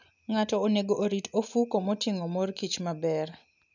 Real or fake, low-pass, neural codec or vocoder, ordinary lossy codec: real; 7.2 kHz; none; none